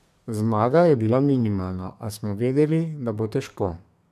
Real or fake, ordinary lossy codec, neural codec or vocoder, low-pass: fake; none; codec, 32 kHz, 1.9 kbps, SNAC; 14.4 kHz